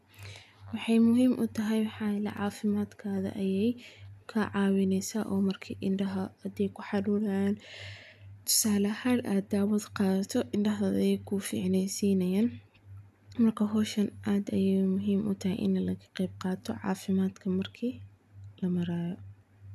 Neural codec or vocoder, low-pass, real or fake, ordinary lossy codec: none; 14.4 kHz; real; none